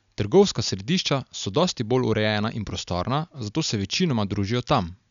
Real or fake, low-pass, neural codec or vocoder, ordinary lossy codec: real; 7.2 kHz; none; none